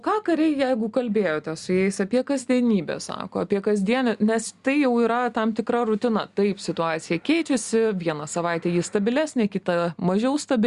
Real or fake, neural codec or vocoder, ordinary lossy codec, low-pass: real; none; Opus, 64 kbps; 10.8 kHz